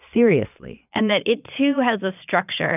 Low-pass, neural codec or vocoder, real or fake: 3.6 kHz; vocoder, 22.05 kHz, 80 mel bands, Vocos; fake